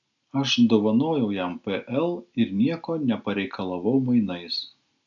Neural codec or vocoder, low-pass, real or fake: none; 7.2 kHz; real